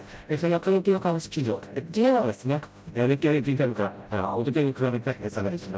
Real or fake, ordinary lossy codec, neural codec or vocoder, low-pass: fake; none; codec, 16 kHz, 0.5 kbps, FreqCodec, smaller model; none